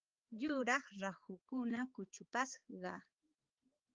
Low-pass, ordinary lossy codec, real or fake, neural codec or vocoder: 7.2 kHz; Opus, 32 kbps; fake; codec, 16 kHz, 4 kbps, X-Codec, HuBERT features, trained on balanced general audio